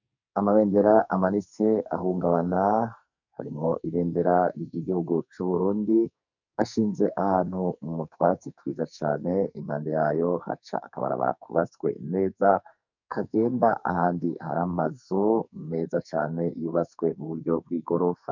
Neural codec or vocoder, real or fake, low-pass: codec, 44.1 kHz, 2.6 kbps, SNAC; fake; 7.2 kHz